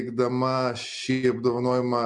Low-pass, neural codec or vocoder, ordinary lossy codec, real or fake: 10.8 kHz; none; MP3, 64 kbps; real